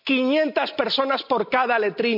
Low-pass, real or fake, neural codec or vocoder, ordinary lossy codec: 5.4 kHz; real; none; none